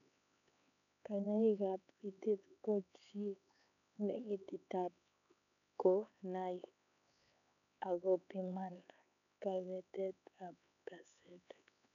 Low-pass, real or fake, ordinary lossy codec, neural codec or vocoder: 7.2 kHz; fake; none; codec, 16 kHz, 4 kbps, X-Codec, HuBERT features, trained on LibriSpeech